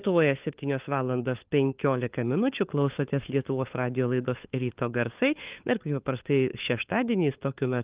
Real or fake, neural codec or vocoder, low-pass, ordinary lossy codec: fake; codec, 16 kHz, 4 kbps, FunCodec, trained on LibriTTS, 50 frames a second; 3.6 kHz; Opus, 32 kbps